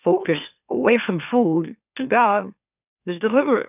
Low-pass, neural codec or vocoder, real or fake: 3.6 kHz; autoencoder, 44.1 kHz, a latent of 192 numbers a frame, MeloTTS; fake